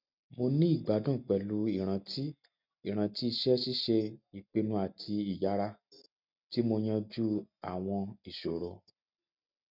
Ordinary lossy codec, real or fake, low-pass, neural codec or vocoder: none; real; 5.4 kHz; none